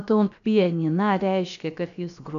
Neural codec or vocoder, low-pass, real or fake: codec, 16 kHz, about 1 kbps, DyCAST, with the encoder's durations; 7.2 kHz; fake